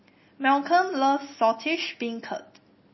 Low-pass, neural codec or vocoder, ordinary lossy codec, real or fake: 7.2 kHz; none; MP3, 24 kbps; real